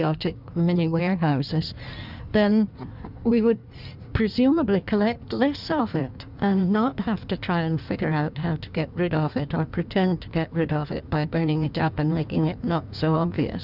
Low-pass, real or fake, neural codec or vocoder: 5.4 kHz; fake; codec, 16 kHz in and 24 kHz out, 1.1 kbps, FireRedTTS-2 codec